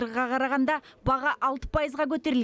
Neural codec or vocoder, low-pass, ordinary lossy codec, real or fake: none; none; none; real